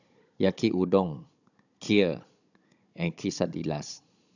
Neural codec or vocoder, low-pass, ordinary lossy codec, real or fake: codec, 16 kHz, 16 kbps, FunCodec, trained on Chinese and English, 50 frames a second; 7.2 kHz; none; fake